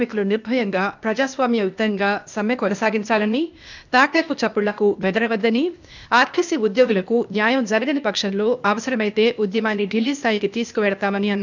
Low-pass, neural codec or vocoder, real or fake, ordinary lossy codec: 7.2 kHz; codec, 16 kHz, 0.8 kbps, ZipCodec; fake; none